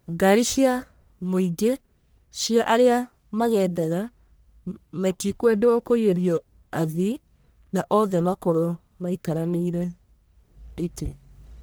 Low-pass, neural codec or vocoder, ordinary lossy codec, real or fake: none; codec, 44.1 kHz, 1.7 kbps, Pupu-Codec; none; fake